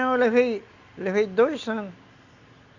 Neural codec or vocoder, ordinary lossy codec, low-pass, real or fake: none; Opus, 64 kbps; 7.2 kHz; real